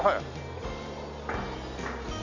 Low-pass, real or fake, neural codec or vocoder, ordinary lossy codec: 7.2 kHz; real; none; none